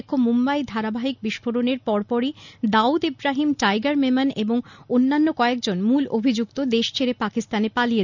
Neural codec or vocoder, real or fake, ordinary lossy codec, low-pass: none; real; none; 7.2 kHz